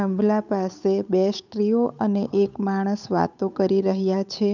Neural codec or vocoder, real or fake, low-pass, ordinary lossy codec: codec, 16 kHz, 8 kbps, FunCodec, trained on Chinese and English, 25 frames a second; fake; 7.2 kHz; none